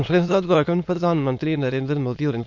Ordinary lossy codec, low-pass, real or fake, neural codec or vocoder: MP3, 48 kbps; 7.2 kHz; fake; autoencoder, 22.05 kHz, a latent of 192 numbers a frame, VITS, trained on many speakers